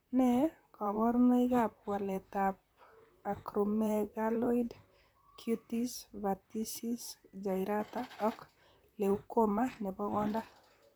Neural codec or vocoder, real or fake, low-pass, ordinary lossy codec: vocoder, 44.1 kHz, 128 mel bands, Pupu-Vocoder; fake; none; none